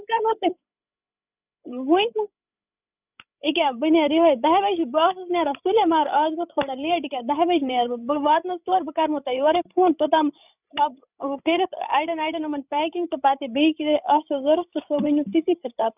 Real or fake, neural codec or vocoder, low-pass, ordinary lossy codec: fake; codec, 16 kHz, 16 kbps, FreqCodec, larger model; 3.6 kHz; none